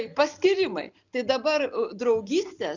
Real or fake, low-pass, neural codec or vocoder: real; 7.2 kHz; none